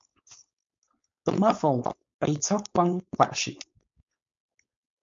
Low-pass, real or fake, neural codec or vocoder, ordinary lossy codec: 7.2 kHz; fake; codec, 16 kHz, 4.8 kbps, FACodec; MP3, 48 kbps